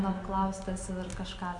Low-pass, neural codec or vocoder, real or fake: 10.8 kHz; none; real